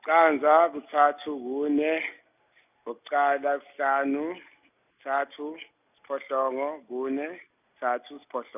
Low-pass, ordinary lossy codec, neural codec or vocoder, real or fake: 3.6 kHz; none; none; real